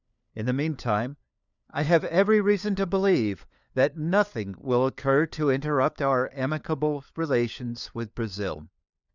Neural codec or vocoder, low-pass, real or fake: codec, 16 kHz, 4 kbps, FunCodec, trained on LibriTTS, 50 frames a second; 7.2 kHz; fake